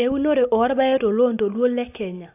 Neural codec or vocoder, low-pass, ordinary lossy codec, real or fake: none; 3.6 kHz; AAC, 24 kbps; real